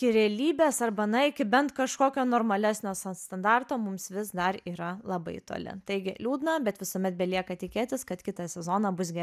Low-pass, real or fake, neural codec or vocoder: 14.4 kHz; real; none